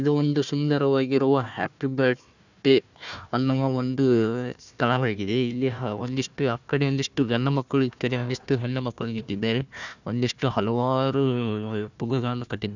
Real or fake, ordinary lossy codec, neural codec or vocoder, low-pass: fake; none; codec, 16 kHz, 1 kbps, FunCodec, trained on Chinese and English, 50 frames a second; 7.2 kHz